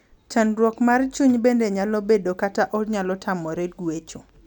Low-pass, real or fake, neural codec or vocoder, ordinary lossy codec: 19.8 kHz; real; none; none